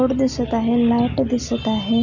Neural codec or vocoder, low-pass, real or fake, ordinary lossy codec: none; 7.2 kHz; real; none